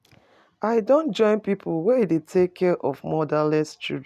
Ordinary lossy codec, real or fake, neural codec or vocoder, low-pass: none; real; none; 14.4 kHz